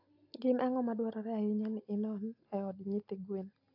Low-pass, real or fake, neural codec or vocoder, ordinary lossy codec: 5.4 kHz; real; none; AAC, 48 kbps